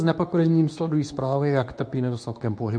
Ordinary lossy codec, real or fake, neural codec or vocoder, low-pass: MP3, 64 kbps; fake; codec, 24 kHz, 0.9 kbps, WavTokenizer, medium speech release version 2; 9.9 kHz